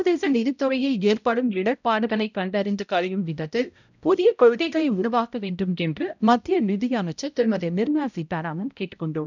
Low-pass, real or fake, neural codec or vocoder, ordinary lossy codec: 7.2 kHz; fake; codec, 16 kHz, 0.5 kbps, X-Codec, HuBERT features, trained on balanced general audio; none